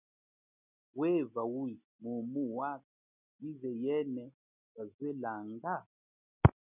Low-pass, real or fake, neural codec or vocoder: 3.6 kHz; real; none